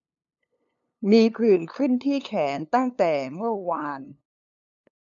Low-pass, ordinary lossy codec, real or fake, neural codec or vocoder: 7.2 kHz; none; fake; codec, 16 kHz, 2 kbps, FunCodec, trained on LibriTTS, 25 frames a second